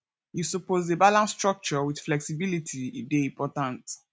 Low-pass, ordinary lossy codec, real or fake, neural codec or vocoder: none; none; real; none